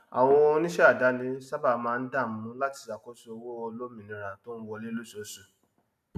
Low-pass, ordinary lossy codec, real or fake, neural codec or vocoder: 14.4 kHz; MP3, 96 kbps; real; none